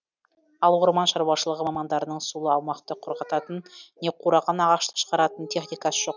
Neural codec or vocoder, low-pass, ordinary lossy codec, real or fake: none; 7.2 kHz; none; real